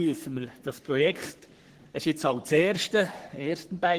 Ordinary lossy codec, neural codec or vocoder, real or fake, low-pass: Opus, 16 kbps; codec, 44.1 kHz, 3.4 kbps, Pupu-Codec; fake; 14.4 kHz